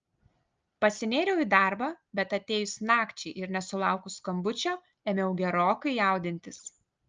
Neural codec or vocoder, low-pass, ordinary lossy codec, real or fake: none; 7.2 kHz; Opus, 24 kbps; real